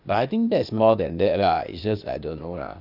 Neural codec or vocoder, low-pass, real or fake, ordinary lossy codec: codec, 16 kHz, 0.8 kbps, ZipCodec; 5.4 kHz; fake; none